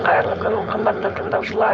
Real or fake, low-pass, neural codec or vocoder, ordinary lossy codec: fake; none; codec, 16 kHz, 4.8 kbps, FACodec; none